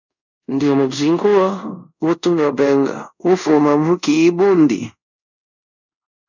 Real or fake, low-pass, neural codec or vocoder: fake; 7.2 kHz; codec, 24 kHz, 0.5 kbps, DualCodec